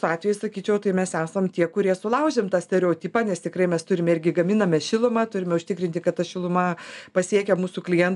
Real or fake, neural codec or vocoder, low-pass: real; none; 10.8 kHz